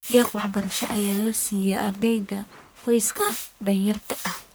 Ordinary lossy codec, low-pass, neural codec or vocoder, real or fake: none; none; codec, 44.1 kHz, 1.7 kbps, Pupu-Codec; fake